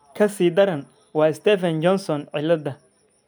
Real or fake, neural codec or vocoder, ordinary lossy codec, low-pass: real; none; none; none